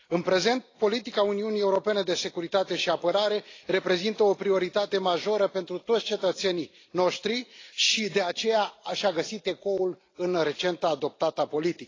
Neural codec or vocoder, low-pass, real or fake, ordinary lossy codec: none; 7.2 kHz; real; AAC, 32 kbps